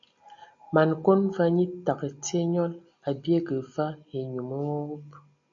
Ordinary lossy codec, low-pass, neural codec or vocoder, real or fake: MP3, 64 kbps; 7.2 kHz; none; real